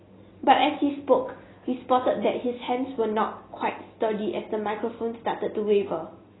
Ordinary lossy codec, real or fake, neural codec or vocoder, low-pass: AAC, 16 kbps; real; none; 7.2 kHz